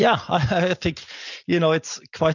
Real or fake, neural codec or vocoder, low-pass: real; none; 7.2 kHz